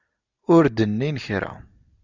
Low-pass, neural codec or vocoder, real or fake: 7.2 kHz; none; real